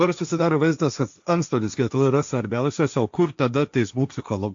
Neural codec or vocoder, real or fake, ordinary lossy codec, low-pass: codec, 16 kHz, 1.1 kbps, Voila-Tokenizer; fake; AAC, 64 kbps; 7.2 kHz